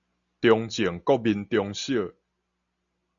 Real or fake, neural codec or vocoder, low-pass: real; none; 7.2 kHz